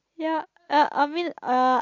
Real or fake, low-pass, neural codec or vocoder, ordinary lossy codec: real; 7.2 kHz; none; MP3, 48 kbps